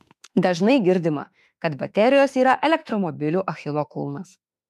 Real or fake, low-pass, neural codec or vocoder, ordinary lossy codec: fake; 14.4 kHz; autoencoder, 48 kHz, 32 numbers a frame, DAC-VAE, trained on Japanese speech; MP3, 96 kbps